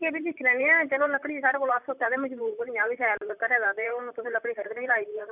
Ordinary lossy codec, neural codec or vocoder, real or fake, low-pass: none; vocoder, 44.1 kHz, 128 mel bands, Pupu-Vocoder; fake; 3.6 kHz